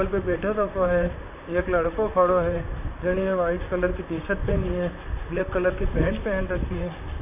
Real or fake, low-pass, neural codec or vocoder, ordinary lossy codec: fake; 3.6 kHz; vocoder, 44.1 kHz, 128 mel bands, Pupu-Vocoder; none